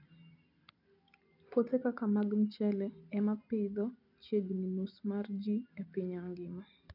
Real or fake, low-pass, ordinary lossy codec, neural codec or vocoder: real; 5.4 kHz; none; none